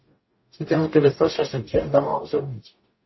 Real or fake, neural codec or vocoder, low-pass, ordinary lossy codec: fake; codec, 44.1 kHz, 0.9 kbps, DAC; 7.2 kHz; MP3, 24 kbps